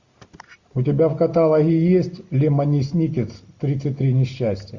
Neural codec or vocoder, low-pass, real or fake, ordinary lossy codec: none; 7.2 kHz; real; MP3, 48 kbps